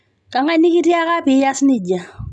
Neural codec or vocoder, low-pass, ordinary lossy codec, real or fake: none; none; none; real